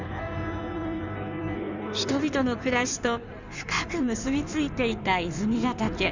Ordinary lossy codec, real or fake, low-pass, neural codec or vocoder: none; fake; 7.2 kHz; codec, 16 kHz in and 24 kHz out, 1.1 kbps, FireRedTTS-2 codec